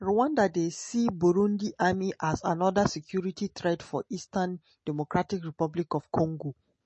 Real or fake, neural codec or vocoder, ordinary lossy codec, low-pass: real; none; MP3, 32 kbps; 10.8 kHz